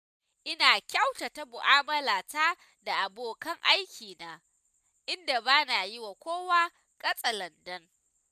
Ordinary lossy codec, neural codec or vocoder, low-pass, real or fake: none; none; 14.4 kHz; real